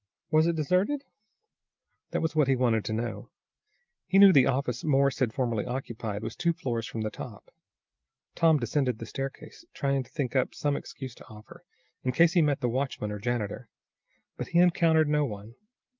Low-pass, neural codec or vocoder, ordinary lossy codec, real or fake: 7.2 kHz; none; Opus, 24 kbps; real